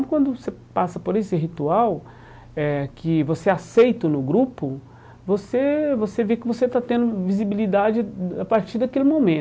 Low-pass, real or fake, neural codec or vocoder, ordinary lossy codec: none; real; none; none